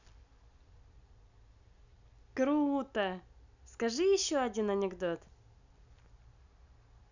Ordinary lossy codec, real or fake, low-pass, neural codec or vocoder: none; real; 7.2 kHz; none